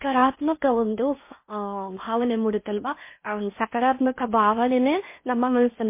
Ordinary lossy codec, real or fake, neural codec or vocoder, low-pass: MP3, 24 kbps; fake; codec, 16 kHz in and 24 kHz out, 0.6 kbps, FocalCodec, streaming, 4096 codes; 3.6 kHz